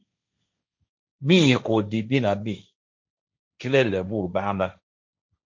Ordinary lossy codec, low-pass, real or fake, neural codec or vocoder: MP3, 64 kbps; 7.2 kHz; fake; codec, 16 kHz, 1.1 kbps, Voila-Tokenizer